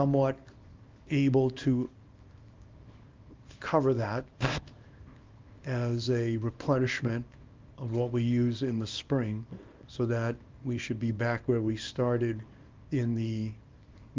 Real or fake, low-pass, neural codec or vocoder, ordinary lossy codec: fake; 7.2 kHz; codec, 24 kHz, 0.9 kbps, WavTokenizer, small release; Opus, 24 kbps